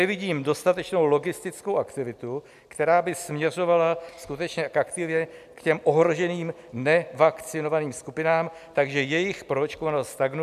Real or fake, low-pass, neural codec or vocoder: real; 14.4 kHz; none